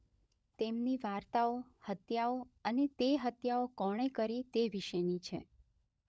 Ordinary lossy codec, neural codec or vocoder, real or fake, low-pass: none; codec, 16 kHz, 16 kbps, FunCodec, trained on LibriTTS, 50 frames a second; fake; none